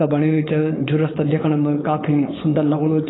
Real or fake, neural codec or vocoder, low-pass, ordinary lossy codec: fake; codec, 16 kHz, 4.8 kbps, FACodec; 7.2 kHz; AAC, 16 kbps